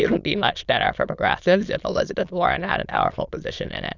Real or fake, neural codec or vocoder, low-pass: fake; autoencoder, 22.05 kHz, a latent of 192 numbers a frame, VITS, trained on many speakers; 7.2 kHz